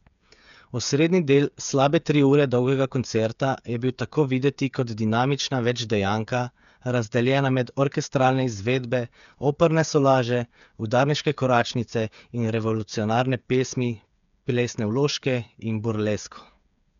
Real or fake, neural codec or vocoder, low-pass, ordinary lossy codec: fake; codec, 16 kHz, 8 kbps, FreqCodec, smaller model; 7.2 kHz; none